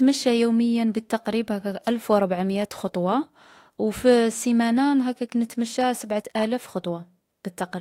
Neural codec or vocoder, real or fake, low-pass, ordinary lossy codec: autoencoder, 48 kHz, 32 numbers a frame, DAC-VAE, trained on Japanese speech; fake; 19.8 kHz; AAC, 48 kbps